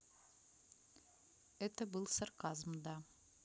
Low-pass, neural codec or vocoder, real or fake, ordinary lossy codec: none; none; real; none